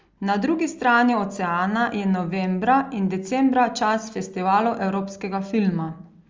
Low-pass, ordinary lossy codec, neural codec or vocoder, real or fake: 7.2 kHz; Opus, 32 kbps; none; real